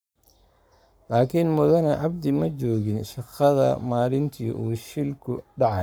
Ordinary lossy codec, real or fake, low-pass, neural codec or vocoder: none; fake; none; codec, 44.1 kHz, 7.8 kbps, Pupu-Codec